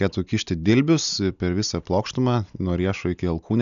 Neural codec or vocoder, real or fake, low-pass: none; real; 7.2 kHz